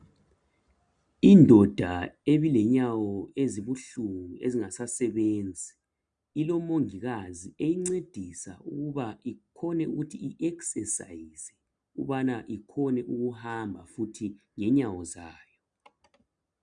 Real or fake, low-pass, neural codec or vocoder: real; 9.9 kHz; none